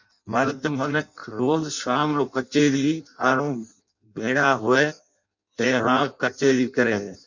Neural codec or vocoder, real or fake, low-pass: codec, 16 kHz in and 24 kHz out, 0.6 kbps, FireRedTTS-2 codec; fake; 7.2 kHz